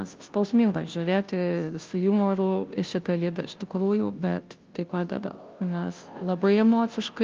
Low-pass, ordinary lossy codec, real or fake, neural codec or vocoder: 7.2 kHz; Opus, 32 kbps; fake; codec, 16 kHz, 0.5 kbps, FunCodec, trained on Chinese and English, 25 frames a second